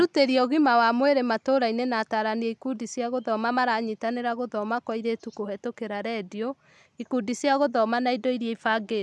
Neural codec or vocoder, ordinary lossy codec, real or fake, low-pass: none; none; real; none